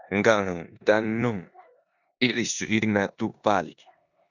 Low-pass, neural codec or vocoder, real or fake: 7.2 kHz; codec, 16 kHz in and 24 kHz out, 0.9 kbps, LongCat-Audio-Codec, four codebook decoder; fake